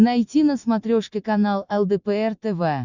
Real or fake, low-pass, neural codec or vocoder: real; 7.2 kHz; none